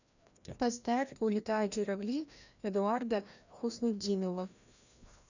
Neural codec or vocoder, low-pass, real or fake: codec, 16 kHz, 1 kbps, FreqCodec, larger model; 7.2 kHz; fake